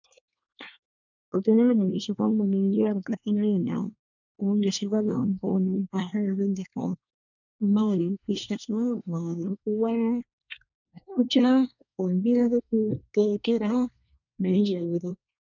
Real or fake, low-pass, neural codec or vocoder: fake; 7.2 kHz; codec, 24 kHz, 1 kbps, SNAC